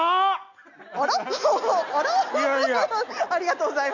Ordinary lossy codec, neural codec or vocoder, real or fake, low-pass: none; none; real; 7.2 kHz